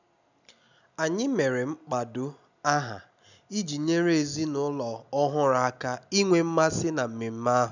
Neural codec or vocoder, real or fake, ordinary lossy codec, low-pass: none; real; none; 7.2 kHz